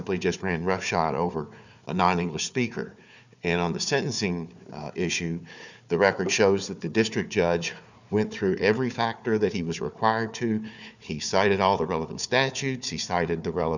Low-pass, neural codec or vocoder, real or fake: 7.2 kHz; codec, 16 kHz, 4 kbps, FunCodec, trained on Chinese and English, 50 frames a second; fake